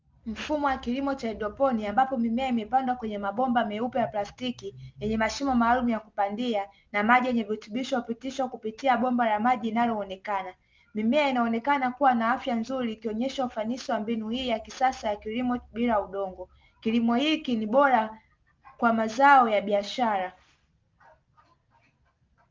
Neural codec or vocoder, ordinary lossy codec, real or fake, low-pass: none; Opus, 24 kbps; real; 7.2 kHz